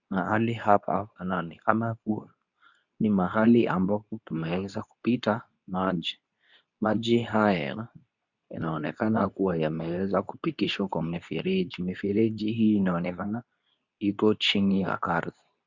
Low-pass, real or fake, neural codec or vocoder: 7.2 kHz; fake; codec, 24 kHz, 0.9 kbps, WavTokenizer, medium speech release version 2